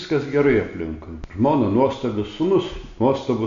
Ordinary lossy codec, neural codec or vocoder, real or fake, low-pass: AAC, 64 kbps; none; real; 7.2 kHz